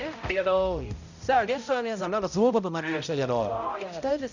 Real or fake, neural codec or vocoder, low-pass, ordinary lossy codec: fake; codec, 16 kHz, 0.5 kbps, X-Codec, HuBERT features, trained on balanced general audio; 7.2 kHz; none